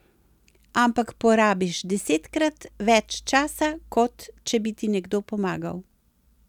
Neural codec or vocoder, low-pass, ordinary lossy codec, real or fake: none; 19.8 kHz; none; real